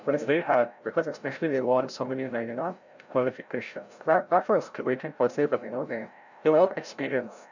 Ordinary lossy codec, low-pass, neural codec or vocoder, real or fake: none; 7.2 kHz; codec, 16 kHz, 0.5 kbps, FreqCodec, larger model; fake